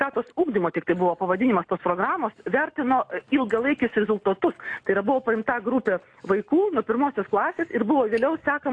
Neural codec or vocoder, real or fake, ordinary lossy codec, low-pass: none; real; AAC, 48 kbps; 9.9 kHz